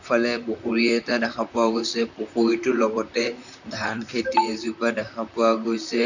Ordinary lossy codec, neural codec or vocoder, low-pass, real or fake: none; vocoder, 44.1 kHz, 128 mel bands, Pupu-Vocoder; 7.2 kHz; fake